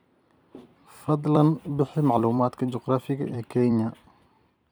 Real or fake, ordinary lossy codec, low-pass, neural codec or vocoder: fake; none; none; vocoder, 44.1 kHz, 128 mel bands every 256 samples, BigVGAN v2